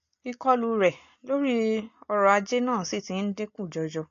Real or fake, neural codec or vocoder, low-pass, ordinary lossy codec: real; none; 7.2 kHz; AAC, 48 kbps